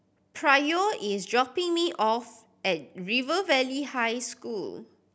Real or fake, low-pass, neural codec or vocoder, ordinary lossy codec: real; none; none; none